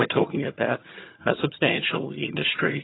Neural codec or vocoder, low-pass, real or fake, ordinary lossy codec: vocoder, 22.05 kHz, 80 mel bands, HiFi-GAN; 7.2 kHz; fake; AAC, 16 kbps